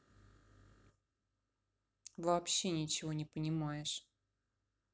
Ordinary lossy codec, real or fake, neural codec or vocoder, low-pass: none; real; none; none